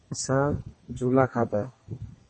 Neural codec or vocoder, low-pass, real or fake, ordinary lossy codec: codec, 32 kHz, 1.9 kbps, SNAC; 10.8 kHz; fake; MP3, 32 kbps